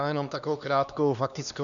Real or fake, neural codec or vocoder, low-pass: fake; codec, 16 kHz, 2 kbps, X-Codec, HuBERT features, trained on LibriSpeech; 7.2 kHz